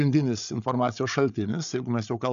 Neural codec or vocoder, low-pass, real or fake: codec, 16 kHz, 8 kbps, FreqCodec, larger model; 7.2 kHz; fake